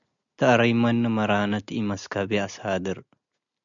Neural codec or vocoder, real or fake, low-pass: none; real; 7.2 kHz